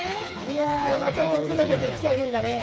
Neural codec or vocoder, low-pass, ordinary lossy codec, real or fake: codec, 16 kHz, 4 kbps, FreqCodec, smaller model; none; none; fake